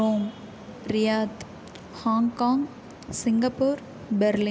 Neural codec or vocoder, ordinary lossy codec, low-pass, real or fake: none; none; none; real